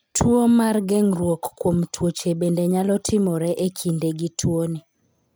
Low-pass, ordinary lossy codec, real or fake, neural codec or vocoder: none; none; real; none